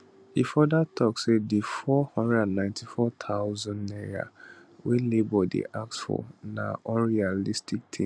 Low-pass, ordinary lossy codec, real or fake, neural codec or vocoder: none; none; real; none